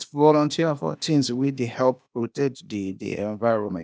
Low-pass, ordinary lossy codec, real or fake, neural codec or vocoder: none; none; fake; codec, 16 kHz, 0.8 kbps, ZipCodec